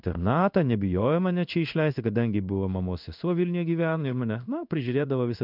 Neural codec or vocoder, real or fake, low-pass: codec, 16 kHz in and 24 kHz out, 1 kbps, XY-Tokenizer; fake; 5.4 kHz